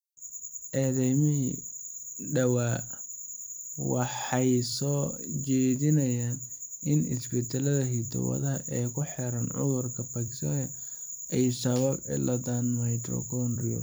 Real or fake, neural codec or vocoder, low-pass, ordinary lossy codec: fake; vocoder, 44.1 kHz, 128 mel bands every 256 samples, BigVGAN v2; none; none